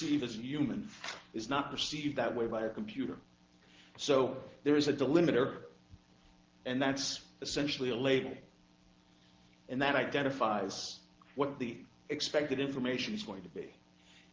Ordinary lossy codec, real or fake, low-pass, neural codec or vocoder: Opus, 16 kbps; real; 7.2 kHz; none